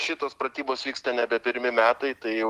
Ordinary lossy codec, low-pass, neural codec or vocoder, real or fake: Opus, 16 kbps; 14.4 kHz; none; real